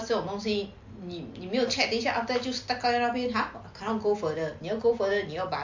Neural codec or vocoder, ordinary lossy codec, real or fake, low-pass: none; MP3, 48 kbps; real; 7.2 kHz